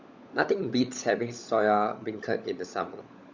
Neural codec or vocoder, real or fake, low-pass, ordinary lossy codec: codec, 16 kHz, 16 kbps, FunCodec, trained on LibriTTS, 50 frames a second; fake; 7.2 kHz; Opus, 64 kbps